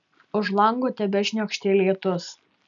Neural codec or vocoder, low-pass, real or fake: none; 7.2 kHz; real